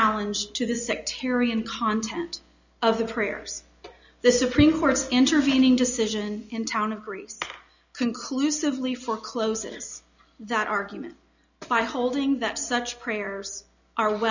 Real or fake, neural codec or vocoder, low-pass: real; none; 7.2 kHz